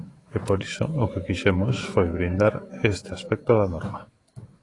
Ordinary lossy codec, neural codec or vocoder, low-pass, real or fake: AAC, 32 kbps; autoencoder, 48 kHz, 128 numbers a frame, DAC-VAE, trained on Japanese speech; 10.8 kHz; fake